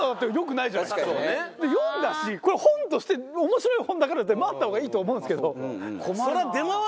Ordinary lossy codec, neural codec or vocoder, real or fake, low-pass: none; none; real; none